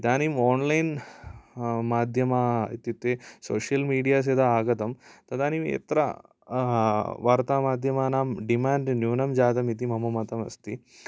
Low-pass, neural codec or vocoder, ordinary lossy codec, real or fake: none; none; none; real